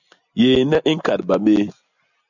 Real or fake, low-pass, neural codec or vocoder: real; 7.2 kHz; none